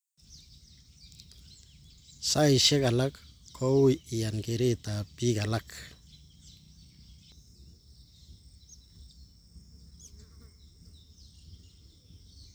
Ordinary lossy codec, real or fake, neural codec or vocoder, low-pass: none; real; none; none